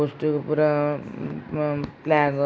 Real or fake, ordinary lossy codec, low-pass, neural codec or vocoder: real; none; none; none